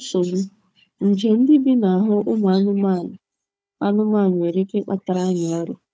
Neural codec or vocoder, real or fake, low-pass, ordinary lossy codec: codec, 16 kHz, 4 kbps, FunCodec, trained on Chinese and English, 50 frames a second; fake; none; none